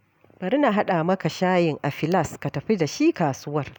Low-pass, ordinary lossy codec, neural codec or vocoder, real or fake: none; none; none; real